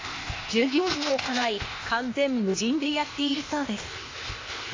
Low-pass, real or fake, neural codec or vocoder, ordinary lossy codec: 7.2 kHz; fake; codec, 16 kHz, 0.8 kbps, ZipCodec; AAC, 32 kbps